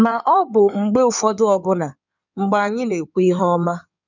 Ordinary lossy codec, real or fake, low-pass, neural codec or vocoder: none; fake; 7.2 kHz; codec, 16 kHz in and 24 kHz out, 2.2 kbps, FireRedTTS-2 codec